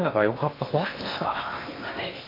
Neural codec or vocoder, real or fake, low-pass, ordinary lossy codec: codec, 16 kHz in and 24 kHz out, 0.8 kbps, FocalCodec, streaming, 65536 codes; fake; 5.4 kHz; MP3, 32 kbps